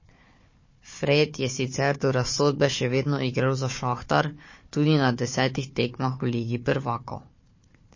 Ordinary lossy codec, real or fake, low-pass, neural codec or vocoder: MP3, 32 kbps; fake; 7.2 kHz; codec, 16 kHz, 4 kbps, FunCodec, trained on Chinese and English, 50 frames a second